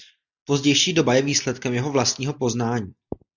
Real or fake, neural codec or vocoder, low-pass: real; none; 7.2 kHz